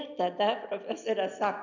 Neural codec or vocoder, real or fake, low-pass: none; real; 7.2 kHz